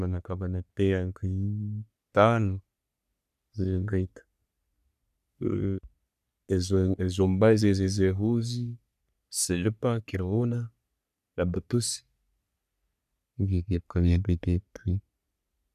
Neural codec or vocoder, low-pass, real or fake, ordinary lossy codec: codec, 44.1 kHz, 3.4 kbps, Pupu-Codec; 14.4 kHz; fake; none